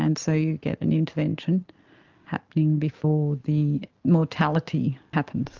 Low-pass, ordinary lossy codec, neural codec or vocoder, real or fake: 7.2 kHz; Opus, 24 kbps; none; real